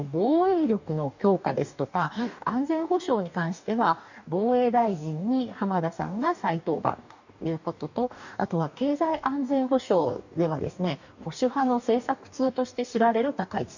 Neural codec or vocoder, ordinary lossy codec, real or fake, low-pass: codec, 44.1 kHz, 2.6 kbps, DAC; none; fake; 7.2 kHz